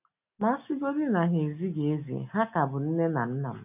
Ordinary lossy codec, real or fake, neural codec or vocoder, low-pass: none; real; none; 3.6 kHz